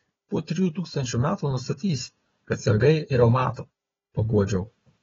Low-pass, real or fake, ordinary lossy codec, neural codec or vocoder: 7.2 kHz; fake; AAC, 24 kbps; codec, 16 kHz, 4 kbps, FunCodec, trained on Chinese and English, 50 frames a second